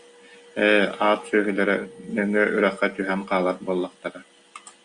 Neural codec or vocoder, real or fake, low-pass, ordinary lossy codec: none; real; 9.9 kHz; Opus, 64 kbps